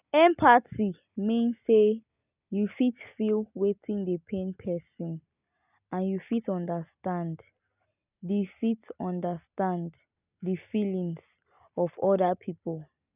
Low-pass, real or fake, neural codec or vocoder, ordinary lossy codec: 3.6 kHz; real; none; none